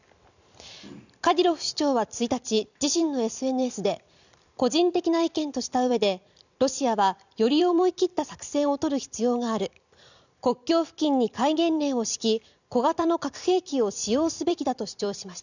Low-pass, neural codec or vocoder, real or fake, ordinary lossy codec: 7.2 kHz; vocoder, 44.1 kHz, 128 mel bands every 256 samples, BigVGAN v2; fake; none